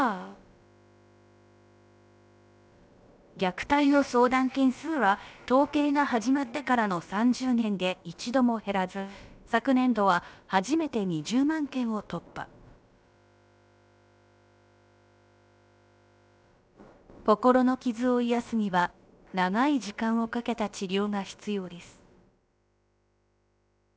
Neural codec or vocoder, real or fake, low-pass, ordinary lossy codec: codec, 16 kHz, about 1 kbps, DyCAST, with the encoder's durations; fake; none; none